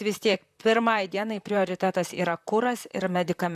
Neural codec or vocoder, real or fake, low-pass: vocoder, 44.1 kHz, 128 mel bands, Pupu-Vocoder; fake; 14.4 kHz